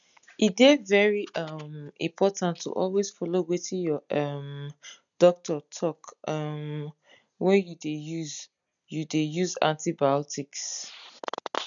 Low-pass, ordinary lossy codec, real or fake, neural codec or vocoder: 7.2 kHz; none; real; none